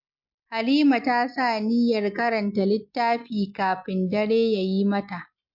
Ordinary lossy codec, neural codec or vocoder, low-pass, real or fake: MP3, 48 kbps; none; 5.4 kHz; real